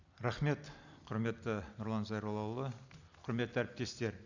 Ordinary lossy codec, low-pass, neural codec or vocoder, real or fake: none; 7.2 kHz; none; real